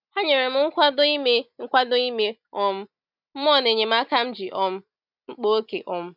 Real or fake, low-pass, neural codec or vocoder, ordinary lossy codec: real; 5.4 kHz; none; none